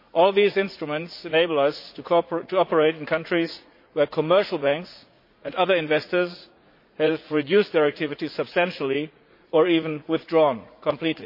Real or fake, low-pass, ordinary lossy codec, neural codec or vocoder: fake; 5.4 kHz; MP3, 32 kbps; vocoder, 44.1 kHz, 80 mel bands, Vocos